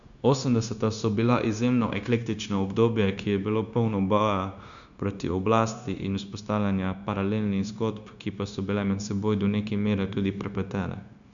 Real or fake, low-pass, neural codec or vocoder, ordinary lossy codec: fake; 7.2 kHz; codec, 16 kHz, 0.9 kbps, LongCat-Audio-Codec; none